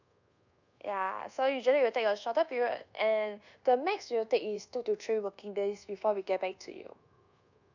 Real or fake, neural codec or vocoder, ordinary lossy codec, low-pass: fake; codec, 24 kHz, 1.2 kbps, DualCodec; none; 7.2 kHz